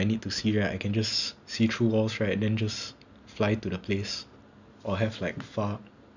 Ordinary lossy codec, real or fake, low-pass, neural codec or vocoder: none; real; 7.2 kHz; none